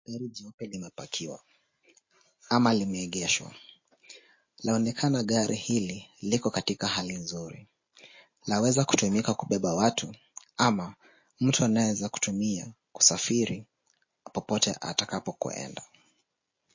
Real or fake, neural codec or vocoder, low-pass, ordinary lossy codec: real; none; 7.2 kHz; MP3, 32 kbps